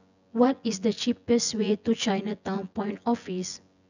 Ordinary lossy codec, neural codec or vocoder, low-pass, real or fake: none; vocoder, 24 kHz, 100 mel bands, Vocos; 7.2 kHz; fake